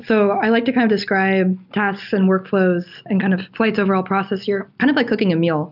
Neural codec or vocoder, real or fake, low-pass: none; real; 5.4 kHz